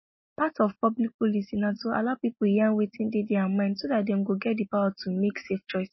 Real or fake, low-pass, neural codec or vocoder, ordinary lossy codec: real; 7.2 kHz; none; MP3, 24 kbps